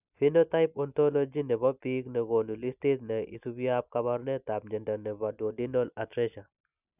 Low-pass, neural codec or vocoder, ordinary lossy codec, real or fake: 3.6 kHz; none; none; real